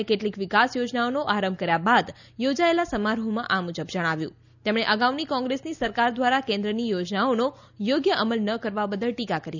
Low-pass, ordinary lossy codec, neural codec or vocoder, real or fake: 7.2 kHz; none; none; real